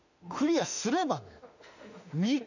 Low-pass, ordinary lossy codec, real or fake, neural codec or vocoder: 7.2 kHz; none; fake; autoencoder, 48 kHz, 32 numbers a frame, DAC-VAE, trained on Japanese speech